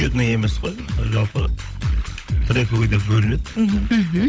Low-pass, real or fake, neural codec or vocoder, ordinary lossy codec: none; fake; codec, 16 kHz, 16 kbps, FunCodec, trained on LibriTTS, 50 frames a second; none